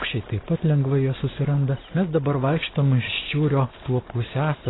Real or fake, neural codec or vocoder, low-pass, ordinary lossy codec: real; none; 7.2 kHz; AAC, 16 kbps